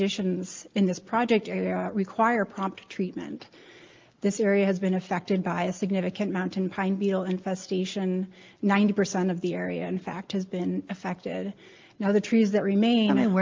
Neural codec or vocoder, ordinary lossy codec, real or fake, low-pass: none; Opus, 32 kbps; real; 7.2 kHz